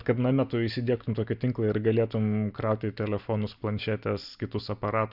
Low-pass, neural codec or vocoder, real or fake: 5.4 kHz; none; real